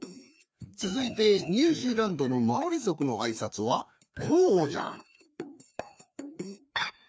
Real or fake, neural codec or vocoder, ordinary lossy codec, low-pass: fake; codec, 16 kHz, 2 kbps, FreqCodec, larger model; none; none